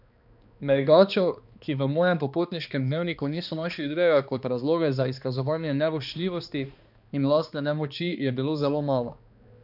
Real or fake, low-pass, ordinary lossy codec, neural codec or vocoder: fake; 5.4 kHz; none; codec, 16 kHz, 2 kbps, X-Codec, HuBERT features, trained on balanced general audio